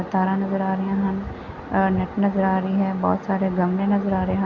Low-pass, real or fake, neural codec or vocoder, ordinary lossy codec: 7.2 kHz; real; none; Opus, 64 kbps